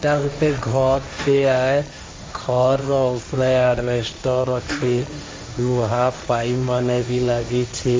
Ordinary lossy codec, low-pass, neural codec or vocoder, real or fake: none; none; codec, 16 kHz, 1.1 kbps, Voila-Tokenizer; fake